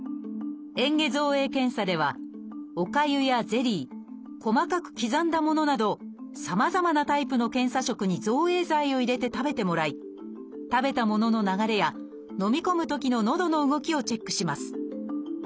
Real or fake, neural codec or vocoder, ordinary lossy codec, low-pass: real; none; none; none